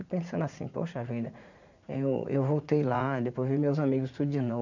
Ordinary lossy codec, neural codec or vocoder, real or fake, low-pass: none; vocoder, 44.1 kHz, 80 mel bands, Vocos; fake; 7.2 kHz